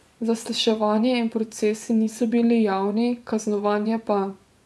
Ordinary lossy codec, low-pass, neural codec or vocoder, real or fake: none; none; vocoder, 24 kHz, 100 mel bands, Vocos; fake